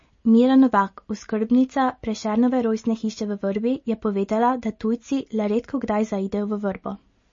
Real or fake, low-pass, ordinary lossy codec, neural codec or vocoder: real; 7.2 kHz; MP3, 32 kbps; none